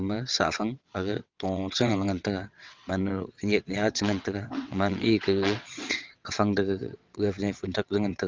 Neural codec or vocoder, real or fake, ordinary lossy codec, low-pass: vocoder, 22.05 kHz, 80 mel bands, WaveNeXt; fake; Opus, 24 kbps; 7.2 kHz